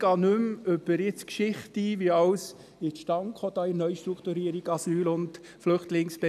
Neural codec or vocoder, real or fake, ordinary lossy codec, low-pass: none; real; none; 14.4 kHz